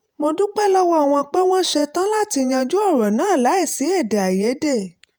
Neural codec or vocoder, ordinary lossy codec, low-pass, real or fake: vocoder, 48 kHz, 128 mel bands, Vocos; none; none; fake